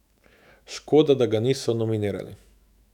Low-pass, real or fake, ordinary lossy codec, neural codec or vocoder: 19.8 kHz; fake; none; autoencoder, 48 kHz, 128 numbers a frame, DAC-VAE, trained on Japanese speech